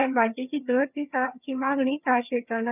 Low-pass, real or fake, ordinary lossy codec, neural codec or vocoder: 3.6 kHz; fake; none; vocoder, 22.05 kHz, 80 mel bands, HiFi-GAN